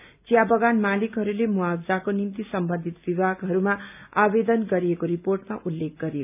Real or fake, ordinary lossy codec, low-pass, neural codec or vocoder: real; none; 3.6 kHz; none